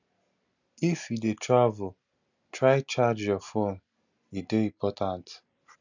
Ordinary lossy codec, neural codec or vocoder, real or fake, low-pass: none; none; real; 7.2 kHz